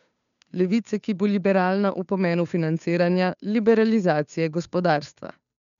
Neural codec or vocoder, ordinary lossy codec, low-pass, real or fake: codec, 16 kHz, 2 kbps, FunCodec, trained on Chinese and English, 25 frames a second; none; 7.2 kHz; fake